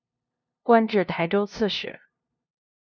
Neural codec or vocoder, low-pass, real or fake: codec, 16 kHz, 0.5 kbps, FunCodec, trained on LibriTTS, 25 frames a second; 7.2 kHz; fake